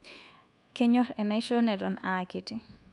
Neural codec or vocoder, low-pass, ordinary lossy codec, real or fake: codec, 24 kHz, 1.2 kbps, DualCodec; 10.8 kHz; none; fake